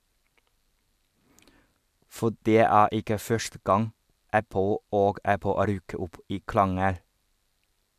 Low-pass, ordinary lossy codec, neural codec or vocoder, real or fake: 14.4 kHz; AAC, 96 kbps; none; real